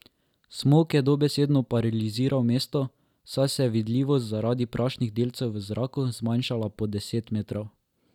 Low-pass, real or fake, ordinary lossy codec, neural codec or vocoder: 19.8 kHz; real; none; none